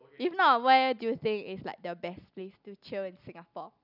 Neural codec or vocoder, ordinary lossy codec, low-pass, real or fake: none; none; 5.4 kHz; real